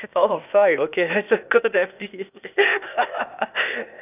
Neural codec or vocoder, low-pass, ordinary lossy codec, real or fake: codec, 16 kHz, 0.8 kbps, ZipCodec; 3.6 kHz; none; fake